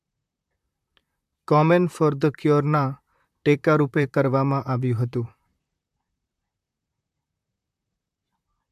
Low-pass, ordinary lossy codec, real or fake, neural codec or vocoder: 14.4 kHz; AAC, 96 kbps; fake; vocoder, 44.1 kHz, 128 mel bands, Pupu-Vocoder